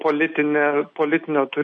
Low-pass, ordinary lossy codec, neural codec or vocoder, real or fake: 9.9 kHz; MP3, 48 kbps; none; real